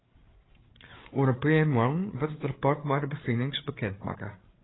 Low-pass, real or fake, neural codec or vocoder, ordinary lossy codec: 7.2 kHz; fake; codec, 16 kHz, 8 kbps, FreqCodec, larger model; AAC, 16 kbps